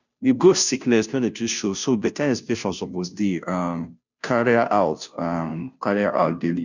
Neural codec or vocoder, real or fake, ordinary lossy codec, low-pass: codec, 16 kHz, 0.5 kbps, FunCodec, trained on Chinese and English, 25 frames a second; fake; none; 7.2 kHz